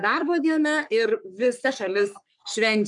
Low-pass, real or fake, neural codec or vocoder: 10.8 kHz; fake; codec, 44.1 kHz, 3.4 kbps, Pupu-Codec